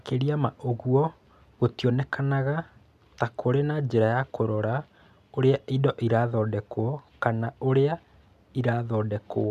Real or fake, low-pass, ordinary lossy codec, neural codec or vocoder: real; 14.4 kHz; none; none